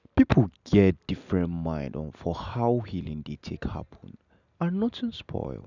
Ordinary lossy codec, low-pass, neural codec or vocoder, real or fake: none; 7.2 kHz; none; real